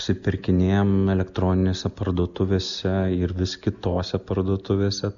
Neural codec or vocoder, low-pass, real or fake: none; 7.2 kHz; real